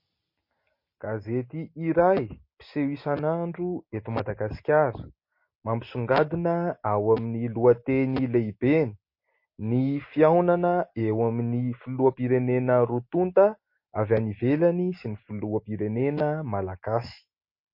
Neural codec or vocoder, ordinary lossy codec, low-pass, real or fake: none; MP3, 32 kbps; 5.4 kHz; real